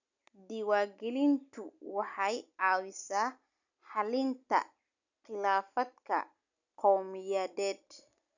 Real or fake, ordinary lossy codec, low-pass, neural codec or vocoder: real; none; 7.2 kHz; none